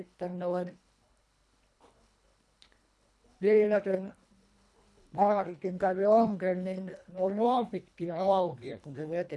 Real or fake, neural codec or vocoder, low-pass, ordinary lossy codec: fake; codec, 24 kHz, 1.5 kbps, HILCodec; none; none